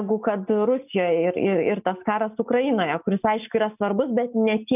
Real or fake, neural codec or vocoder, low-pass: real; none; 3.6 kHz